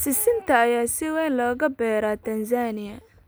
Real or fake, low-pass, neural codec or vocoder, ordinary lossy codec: real; none; none; none